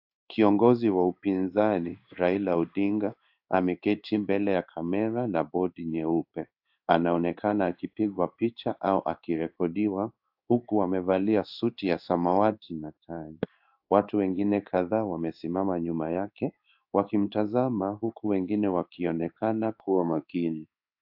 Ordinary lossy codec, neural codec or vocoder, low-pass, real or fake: AAC, 48 kbps; codec, 16 kHz in and 24 kHz out, 1 kbps, XY-Tokenizer; 5.4 kHz; fake